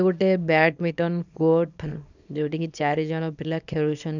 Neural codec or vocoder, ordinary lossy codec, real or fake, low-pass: codec, 24 kHz, 0.9 kbps, WavTokenizer, small release; none; fake; 7.2 kHz